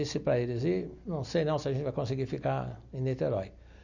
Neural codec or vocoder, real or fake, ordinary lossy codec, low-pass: none; real; none; 7.2 kHz